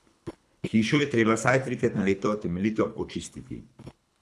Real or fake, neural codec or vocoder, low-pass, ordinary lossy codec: fake; codec, 24 kHz, 3 kbps, HILCodec; none; none